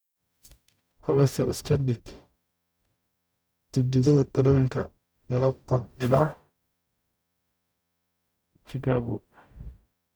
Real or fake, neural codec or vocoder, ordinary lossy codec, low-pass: fake; codec, 44.1 kHz, 0.9 kbps, DAC; none; none